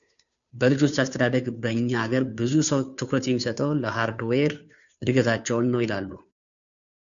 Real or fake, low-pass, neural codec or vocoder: fake; 7.2 kHz; codec, 16 kHz, 2 kbps, FunCodec, trained on Chinese and English, 25 frames a second